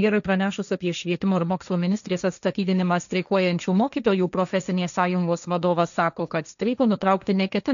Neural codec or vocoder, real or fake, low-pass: codec, 16 kHz, 1.1 kbps, Voila-Tokenizer; fake; 7.2 kHz